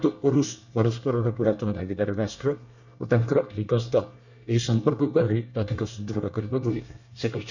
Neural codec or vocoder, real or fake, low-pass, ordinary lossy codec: codec, 24 kHz, 1 kbps, SNAC; fake; 7.2 kHz; none